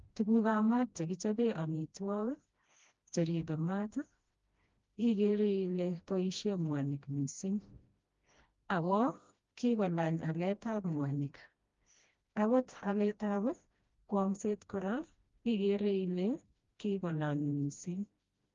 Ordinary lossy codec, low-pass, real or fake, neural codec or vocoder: Opus, 32 kbps; 7.2 kHz; fake; codec, 16 kHz, 1 kbps, FreqCodec, smaller model